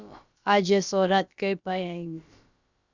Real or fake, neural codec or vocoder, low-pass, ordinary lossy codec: fake; codec, 16 kHz, about 1 kbps, DyCAST, with the encoder's durations; 7.2 kHz; Opus, 64 kbps